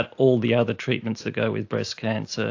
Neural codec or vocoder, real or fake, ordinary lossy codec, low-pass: none; real; AAC, 48 kbps; 7.2 kHz